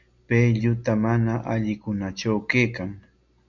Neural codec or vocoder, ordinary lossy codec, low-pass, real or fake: none; MP3, 64 kbps; 7.2 kHz; real